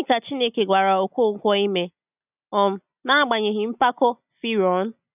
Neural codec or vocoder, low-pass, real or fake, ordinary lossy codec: none; 3.6 kHz; real; none